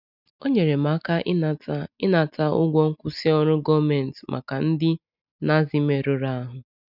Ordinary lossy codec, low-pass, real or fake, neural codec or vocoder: none; 5.4 kHz; real; none